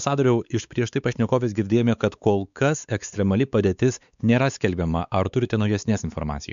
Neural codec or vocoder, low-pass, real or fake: codec, 16 kHz, 4 kbps, X-Codec, WavLM features, trained on Multilingual LibriSpeech; 7.2 kHz; fake